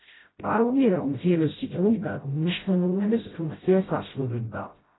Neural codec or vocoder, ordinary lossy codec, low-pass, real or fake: codec, 16 kHz, 0.5 kbps, FreqCodec, smaller model; AAC, 16 kbps; 7.2 kHz; fake